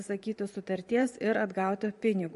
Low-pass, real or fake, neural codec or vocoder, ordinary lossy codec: 14.4 kHz; fake; vocoder, 44.1 kHz, 128 mel bands every 512 samples, BigVGAN v2; MP3, 48 kbps